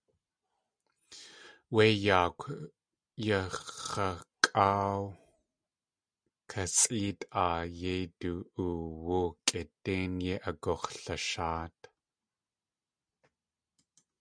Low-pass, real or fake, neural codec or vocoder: 9.9 kHz; real; none